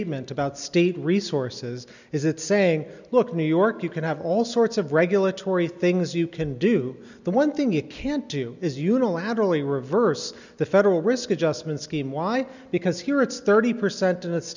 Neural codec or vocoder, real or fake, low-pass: none; real; 7.2 kHz